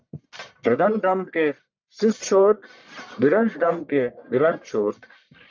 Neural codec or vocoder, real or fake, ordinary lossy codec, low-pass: codec, 44.1 kHz, 1.7 kbps, Pupu-Codec; fake; MP3, 64 kbps; 7.2 kHz